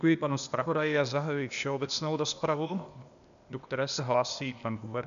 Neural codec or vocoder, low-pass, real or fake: codec, 16 kHz, 0.8 kbps, ZipCodec; 7.2 kHz; fake